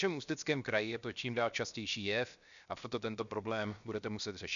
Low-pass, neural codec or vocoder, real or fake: 7.2 kHz; codec, 16 kHz, 0.7 kbps, FocalCodec; fake